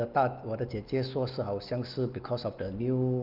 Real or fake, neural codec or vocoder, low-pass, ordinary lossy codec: real; none; 5.4 kHz; Opus, 24 kbps